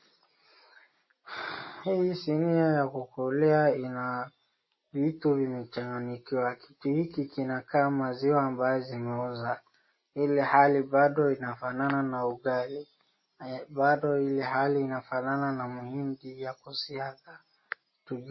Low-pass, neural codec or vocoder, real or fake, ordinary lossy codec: 7.2 kHz; none; real; MP3, 24 kbps